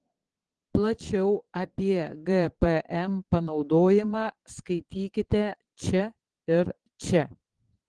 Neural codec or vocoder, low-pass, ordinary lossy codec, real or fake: vocoder, 22.05 kHz, 80 mel bands, Vocos; 9.9 kHz; Opus, 16 kbps; fake